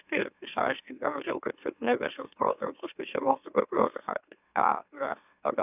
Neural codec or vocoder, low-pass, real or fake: autoencoder, 44.1 kHz, a latent of 192 numbers a frame, MeloTTS; 3.6 kHz; fake